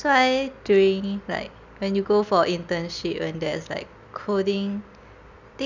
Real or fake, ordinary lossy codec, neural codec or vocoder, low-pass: real; none; none; 7.2 kHz